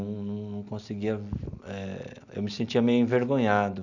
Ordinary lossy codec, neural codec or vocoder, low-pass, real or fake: none; none; 7.2 kHz; real